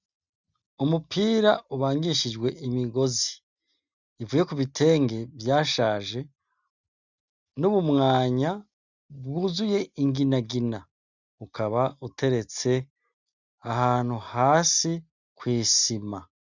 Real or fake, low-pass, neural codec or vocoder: real; 7.2 kHz; none